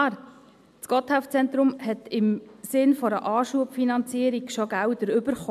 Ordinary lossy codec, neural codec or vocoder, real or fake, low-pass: MP3, 96 kbps; none; real; 14.4 kHz